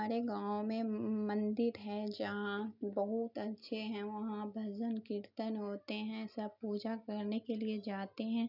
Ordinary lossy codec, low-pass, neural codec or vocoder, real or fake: none; 5.4 kHz; none; real